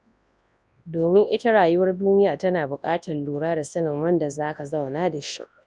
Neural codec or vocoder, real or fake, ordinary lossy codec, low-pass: codec, 24 kHz, 0.9 kbps, WavTokenizer, large speech release; fake; none; 10.8 kHz